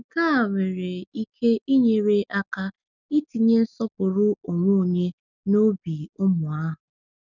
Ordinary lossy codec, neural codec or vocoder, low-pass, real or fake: none; none; 7.2 kHz; real